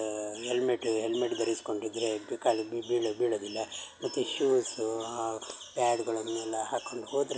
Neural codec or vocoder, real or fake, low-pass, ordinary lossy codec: none; real; none; none